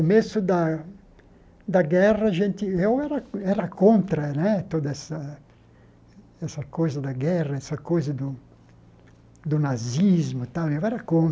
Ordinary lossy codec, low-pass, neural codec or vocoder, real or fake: none; none; none; real